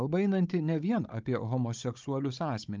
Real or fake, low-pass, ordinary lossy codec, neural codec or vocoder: fake; 7.2 kHz; Opus, 24 kbps; codec, 16 kHz, 16 kbps, FunCodec, trained on Chinese and English, 50 frames a second